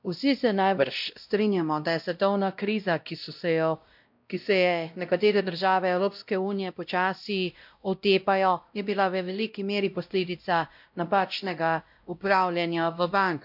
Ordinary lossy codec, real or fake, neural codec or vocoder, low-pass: MP3, 48 kbps; fake; codec, 16 kHz, 0.5 kbps, X-Codec, WavLM features, trained on Multilingual LibriSpeech; 5.4 kHz